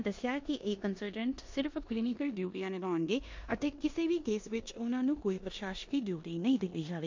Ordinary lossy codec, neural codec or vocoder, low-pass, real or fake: MP3, 48 kbps; codec, 16 kHz in and 24 kHz out, 0.9 kbps, LongCat-Audio-Codec, four codebook decoder; 7.2 kHz; fake